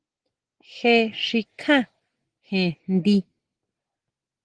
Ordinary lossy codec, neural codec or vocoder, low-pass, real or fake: Opus, 16 kbps; none; 9.9 kHz; real